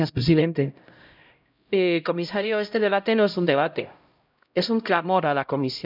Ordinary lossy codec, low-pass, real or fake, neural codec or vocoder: none; 5.4 kHz; fake; codec, 16 kHz, 0.5 kbps, X-Codec, HuBERT features, trained on LibriSpeech